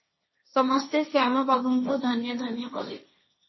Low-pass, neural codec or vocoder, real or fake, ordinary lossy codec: 7.2 kHz; codec, 16 kHz, 1.1 kbps, Voila-Tokenizer; fake; MP3, 24 kbps